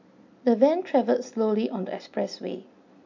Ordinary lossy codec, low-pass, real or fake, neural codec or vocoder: MP3, 64 kbps; 7.2 kHz; real; none